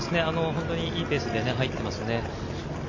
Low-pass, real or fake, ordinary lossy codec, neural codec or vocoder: 7.2 kHz; real; MP3, 32 kbps; none